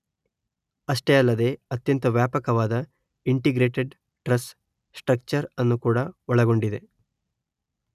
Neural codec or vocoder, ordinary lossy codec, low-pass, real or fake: none; none; 14.4 kHz; real